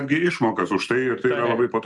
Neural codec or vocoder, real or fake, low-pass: none; real; 10.8 kHz